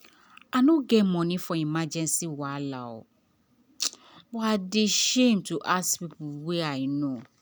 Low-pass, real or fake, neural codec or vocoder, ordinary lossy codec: none; real; none; none